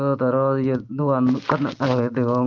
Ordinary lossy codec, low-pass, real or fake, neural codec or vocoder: Opus, 16 kbps; 7.2 kHz; real; none